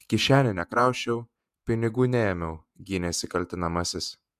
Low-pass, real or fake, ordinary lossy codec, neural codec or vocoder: 14.4 kHz; fake; MP3, 96 kbps; vocoder, 48 kHz, 128 mel bands, Vocos